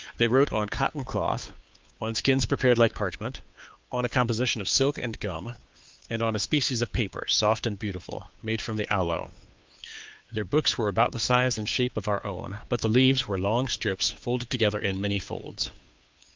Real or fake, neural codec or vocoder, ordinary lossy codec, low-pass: fake; codec, 16 kHz, 2 kbps, FunCodec, trained on Chinese and English, 25 frames a second; Opus, 24 kbps; 7.2 kHz